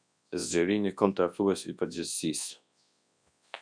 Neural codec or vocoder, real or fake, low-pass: codec, 24 kHz, 0.9 kbps, WavTokenizer, large speech release; fake; 9.9 kHz